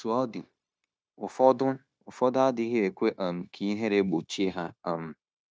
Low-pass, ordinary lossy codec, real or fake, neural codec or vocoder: none; none; fake; codec, 16 kHz, 0.9 kbps, LongCat-Audio-Codec